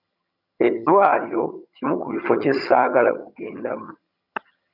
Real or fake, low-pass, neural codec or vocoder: fake; 5.4 kHz; vocoder, 22.05 kHz, 80 mel bands, HiFi-GAN